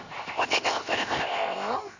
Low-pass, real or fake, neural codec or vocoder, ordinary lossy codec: 7.2 kHz; fake; codec, 16 kHz, 0.7 kbps, FocalCodec; Opus, 64 kbps